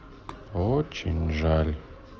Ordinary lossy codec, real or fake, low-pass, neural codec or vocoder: Opus, 24 kbps; real; 7.2 kHz; none